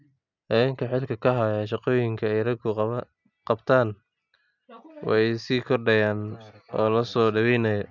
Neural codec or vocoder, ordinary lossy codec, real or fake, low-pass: none; none; real; 7.2 kHz